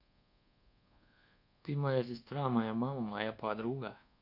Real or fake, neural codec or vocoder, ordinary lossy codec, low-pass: fake; codec, 24 kHz, 1.2 kbps, DualCodec; none; 5.4 kHz